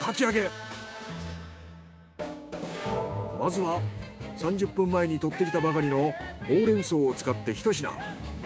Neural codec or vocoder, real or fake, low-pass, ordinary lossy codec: codec, 16 kHz, 6 kbps, DAC; fake; none; none